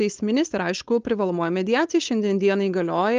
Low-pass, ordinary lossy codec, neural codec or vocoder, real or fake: 7.2 kHz; Opus, 24 kbps; codec, 16 kHz, 4.8 kbps, FACodec; fake